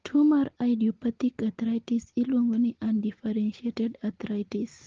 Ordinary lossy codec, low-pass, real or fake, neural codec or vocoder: Opus, 16 kbps; 7.2 kHz; real; none